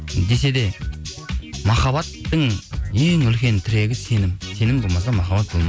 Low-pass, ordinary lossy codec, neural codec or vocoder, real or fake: none; none; none; real